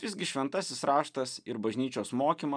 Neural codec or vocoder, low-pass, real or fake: none; 9.9 kHz; real